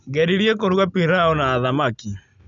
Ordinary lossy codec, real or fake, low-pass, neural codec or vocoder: none; real; 7.2 kHz; none